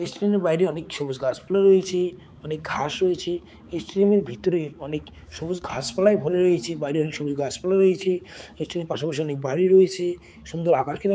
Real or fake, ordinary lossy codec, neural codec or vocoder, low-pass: fake; none; codec, 16 kHz, 4 kbps, X-Codec, HuBERT features, trained on balanced general audio; none